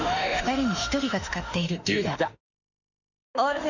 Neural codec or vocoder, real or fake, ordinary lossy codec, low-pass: autoencoder, 48 kHz, 32 numbers a frame, DAC-VAE, trained on Japanese speech; fake; none; 7.2 kHz